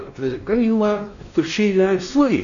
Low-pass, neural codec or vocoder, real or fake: 7.2 kHz; codec, 16 kHz, 1 kbps, X-Codec, WavLM features, trained on Multilingual LibriSpeech; fake